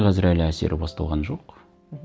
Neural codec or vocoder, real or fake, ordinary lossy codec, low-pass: none; real; none; none